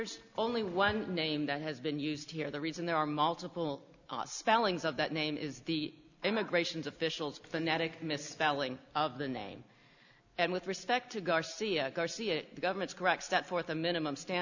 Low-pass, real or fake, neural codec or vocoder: 7.2 kHz; real; none